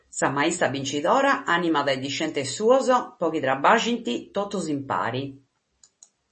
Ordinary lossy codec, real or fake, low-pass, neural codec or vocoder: MP3, 32 kbps; real; 9.9 kHz; none